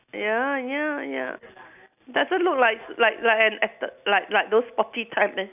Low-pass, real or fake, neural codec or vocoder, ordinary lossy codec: 3.6 kHz; real; none; none